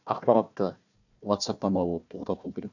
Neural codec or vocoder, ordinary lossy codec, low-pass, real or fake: codec, 16 kHz, 1 kbps, FunCodec, trained on Chinese and English, 50 frames a second; none; 7.2 kHz; fake